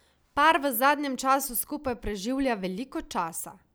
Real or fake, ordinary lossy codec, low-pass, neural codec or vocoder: real; none; none; none